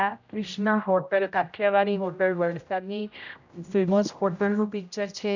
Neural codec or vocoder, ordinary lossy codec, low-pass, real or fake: codec, 16 kHz, 0.5 kbps, X-Codec, HuBERT features, trained on general audio; none; 7.2 kHz; fake